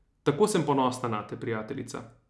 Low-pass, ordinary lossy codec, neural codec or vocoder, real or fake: none; none; none; real